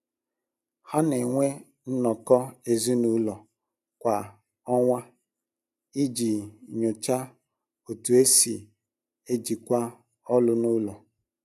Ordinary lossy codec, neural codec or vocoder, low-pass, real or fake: none; none; 14.4 kHz; real